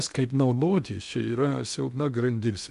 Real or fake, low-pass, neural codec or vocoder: fake; 10.8 kHz; codec, 16 kHz in and 24 kHz out, 0.6 kbps, FocalCodec, streaming, 2048 codes